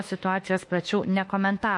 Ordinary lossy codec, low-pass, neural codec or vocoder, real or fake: MP3, 48 kbps; 10.8 kHz; autoencoder, 48 kHz, 32 numbers a frame, DAC-VAE, trained on Japanese speech; fake